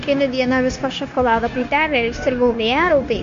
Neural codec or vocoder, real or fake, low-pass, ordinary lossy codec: codec, 16 kHz, 0.9 kbps, LongCat-Audio-Codec; fake; 7.2 kHz; MP3, 48 kbps